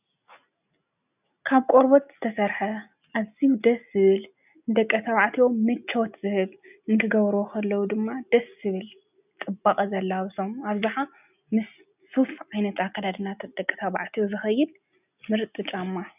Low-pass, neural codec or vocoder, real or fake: 3.6 kHz; none; real